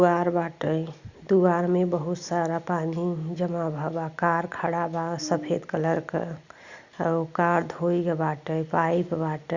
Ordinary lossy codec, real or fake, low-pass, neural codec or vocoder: Opus, 64 kbps; real; 7.2 kHz; none